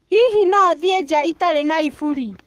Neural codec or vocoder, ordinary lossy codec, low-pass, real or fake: codec, 32 kHz, 1.9 kbps, SNAC; Opus, 32 kbps; 14.4 kHz; fake